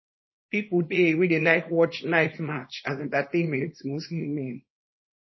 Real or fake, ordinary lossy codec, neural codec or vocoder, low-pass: fake; MP3, 24 kbps; codec, 24 kHz, 0.9 kbps, WavTokenizer, small release; 7.2 kHz